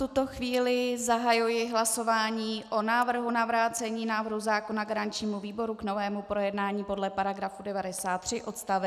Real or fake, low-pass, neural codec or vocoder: real; 14.4 kHz; none